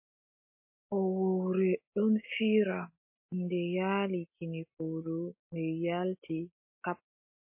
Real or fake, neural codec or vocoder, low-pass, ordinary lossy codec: real; none; 3.6 kHz; MP3, 24 kbps